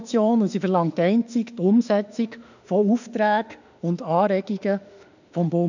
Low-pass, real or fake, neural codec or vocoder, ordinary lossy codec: 7.2 kHz; fake; autoencoder, 48 kHz, 32 numbers a frame, DAC-VAE, trained on Japanese speech; none